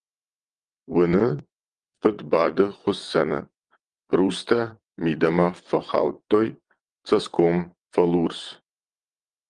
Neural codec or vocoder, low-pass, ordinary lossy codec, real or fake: vocoder, 22.05 kHz, 80 mel bands, WaveNeXt; 9.9 kHz; Opus, 24 kbps; fake